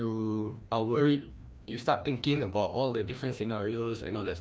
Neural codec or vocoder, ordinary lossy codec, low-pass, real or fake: codec, 16 kHz, 1 kbps, FreqCodec, larger model; none; none; fake